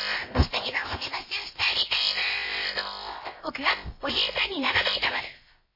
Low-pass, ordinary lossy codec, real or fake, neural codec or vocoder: 5.4 kHz; MP3, 24 kbps; fake; codec, 16 kHz, about 1 kbps, DyCAST, with the encoder's durations